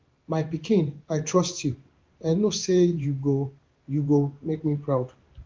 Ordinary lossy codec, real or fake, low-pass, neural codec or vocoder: Opus, 24 kbps; fake; 7.2 kHz; codec, 16 kHz in and 24 kHz out, 1 kbps, XY-Tokenizer